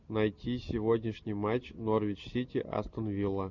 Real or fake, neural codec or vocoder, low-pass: real; none; 7.2 kHz